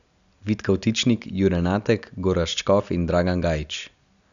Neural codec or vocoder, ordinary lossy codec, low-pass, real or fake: none; none; 7.2 kHz; real